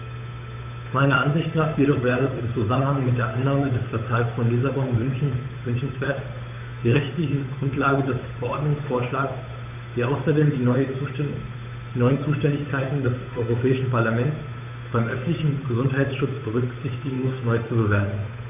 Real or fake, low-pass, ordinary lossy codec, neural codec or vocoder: fake; 3.6 kHz; none; codec, 16 kHz, 8 kbps, FunCodec, trained on Chinese and English, 25 frames a second